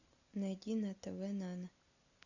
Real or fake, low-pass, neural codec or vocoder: real; 7.2 kHz; none